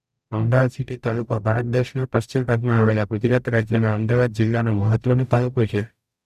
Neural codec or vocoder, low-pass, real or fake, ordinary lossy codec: codec, 44.1 kHz, 0.9 kbps, DAC; 14.4 kHz; fake; none